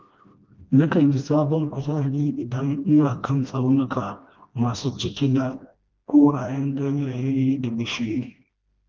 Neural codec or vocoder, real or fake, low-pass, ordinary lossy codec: codec, 16 kHz, 1 kbps, FreqCodec, smaller model; fake; 7.2 kHz; Opus, 32 kbps